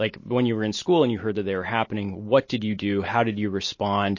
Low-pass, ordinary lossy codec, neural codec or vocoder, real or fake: 7.2 kHz; MP3, 32 kbps; none; real